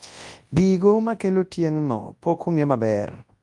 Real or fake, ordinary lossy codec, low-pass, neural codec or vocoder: fake; Opus, 32 kbps; 10.8 kHz; codec, 24 kHz, 0.9 kbps, WavTokenizer, large speech release